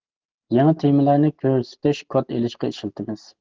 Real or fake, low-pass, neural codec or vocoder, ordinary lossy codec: real; 7.2 kHz; none; Opus, 16 kbps